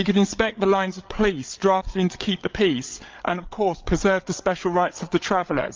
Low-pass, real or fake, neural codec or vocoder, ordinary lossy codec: 7.2 kHz; fake; codec, 16 kHz, 8 kbps, FreqCodec, larger model; Opus, 24 kbps